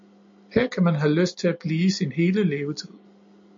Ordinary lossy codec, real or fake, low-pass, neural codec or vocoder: MP3, 48 kbps; real; 7.2 kHz; none